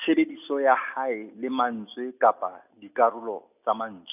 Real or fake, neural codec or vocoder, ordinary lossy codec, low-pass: real; none; none; 3.6 kHz